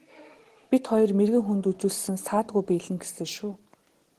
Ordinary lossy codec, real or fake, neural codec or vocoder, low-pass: Opus, 16 kbps; real; none; 14.4 kHz